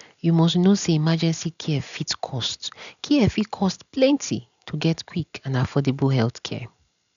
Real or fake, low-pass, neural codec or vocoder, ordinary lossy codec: real; 7.2 kHz; none; none